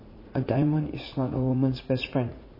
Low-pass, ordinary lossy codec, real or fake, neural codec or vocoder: 5.4 kHz; MP3, 24 kbps; fake; vocoder, 44.1 kHz, 80 mel bands, Vocos